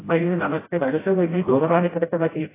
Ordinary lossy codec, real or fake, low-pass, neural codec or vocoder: AAC, 16 kbps; fake; 3.6 kHz; codec, 16 kHz, 0.5 kbps, FreqCodec, smaller model